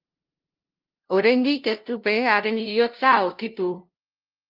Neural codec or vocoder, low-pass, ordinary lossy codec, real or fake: codec, 16 kHz, 0.5 kbps, FunCodec, trained on LibriTTS, 25 frames a second; 5.4 kHz; Opus, 24 kbps; fake